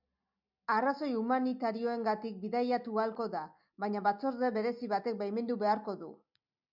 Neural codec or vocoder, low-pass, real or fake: none; 5.4 kHz; real